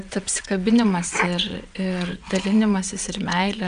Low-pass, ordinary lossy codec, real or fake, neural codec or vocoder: 9.9 kHz; AAC, 96 kbps; fake; vocoder, 22.05 kHz, 80 mel bands, WaveNeXt